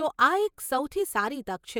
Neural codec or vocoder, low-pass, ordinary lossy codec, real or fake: vocoder, 48 kHz, 128 mel bands, Vocos; 19.8 kHz; none; fake